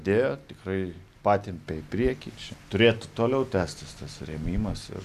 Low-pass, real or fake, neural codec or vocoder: 14.4 kHz; real; none